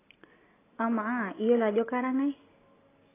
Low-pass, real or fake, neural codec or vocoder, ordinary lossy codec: 3.6 kHz; real; none; AAC, 16 kbps